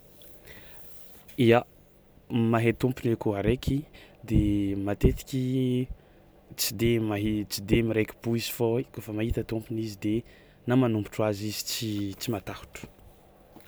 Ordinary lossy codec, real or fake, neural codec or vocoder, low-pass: none; real; none; none